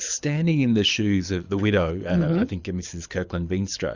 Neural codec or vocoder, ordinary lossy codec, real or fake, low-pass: codec, 24 kHz, 6 kbps, HILCodec; Opus, 64 kbps; fake; 7.2 kHz